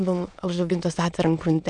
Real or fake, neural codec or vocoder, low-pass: fake; autoencoder, 22.05 kHz, a latent of 192 numbers a frame, VITS, trained on many speakers; 9.9 kHz